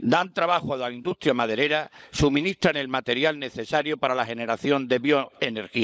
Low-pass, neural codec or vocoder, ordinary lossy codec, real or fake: none; codec, 16 kHz, 16 kbps, FunCodec, trained on LibriTTS, 50 frames a second; none; fake